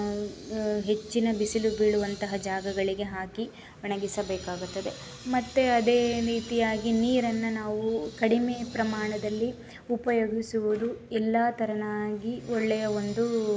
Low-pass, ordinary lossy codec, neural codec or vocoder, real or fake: none; none; none; real